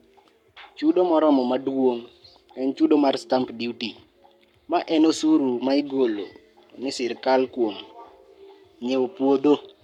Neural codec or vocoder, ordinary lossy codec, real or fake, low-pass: codec, 44.1 kHz, 7.8 kbps, Pupu-Codec; none; fake; 19.8 kHz